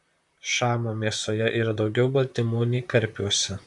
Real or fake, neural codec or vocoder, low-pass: fake; vocoder, 44.1 kHz, 128 mel bands, Pupu-Vocoder; 10.8 kHz